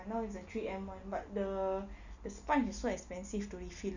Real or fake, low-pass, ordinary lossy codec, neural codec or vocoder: real; 7.2 kHz; none; none